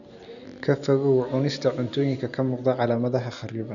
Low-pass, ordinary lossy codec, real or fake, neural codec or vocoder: 7.2 kHz; none; real; none